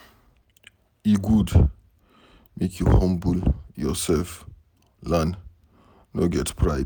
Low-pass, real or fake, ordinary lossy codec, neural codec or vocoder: none; real; none; none